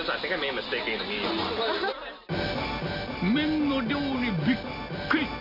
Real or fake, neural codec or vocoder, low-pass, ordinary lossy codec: real; none; 5.4 kHz; Opus, 64 kbps